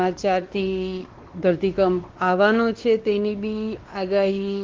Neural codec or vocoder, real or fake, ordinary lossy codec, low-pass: codec, 16 kHz, 2 kbps, X-Codec, WavLM features, trained on Multilingual LibriSpeech; fake; Opus, 16 kbps; 7.2 kHz